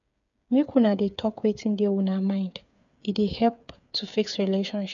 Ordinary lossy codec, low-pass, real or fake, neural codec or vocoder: none; 7.2 kHz; fake; codec, 16 kHz, 8 kbps, FreqCodec, smaller model